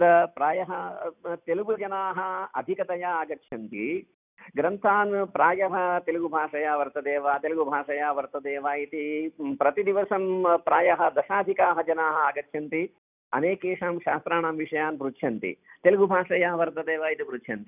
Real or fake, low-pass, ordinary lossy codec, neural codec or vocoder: real; 3.6 kHz; none; none